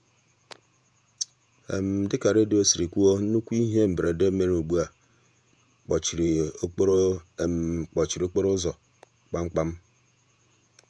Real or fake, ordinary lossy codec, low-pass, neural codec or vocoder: fake; none; 9.9 kHz; vocoder, 48 kHz, 128 mel bands, Vocos